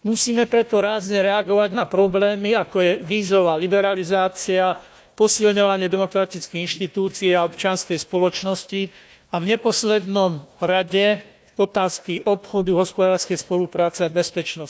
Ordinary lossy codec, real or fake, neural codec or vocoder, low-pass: none; fake; codec, 16 kHz, 1 kbps, FunCodec, trained on Chinese and English, 50 frames a second; none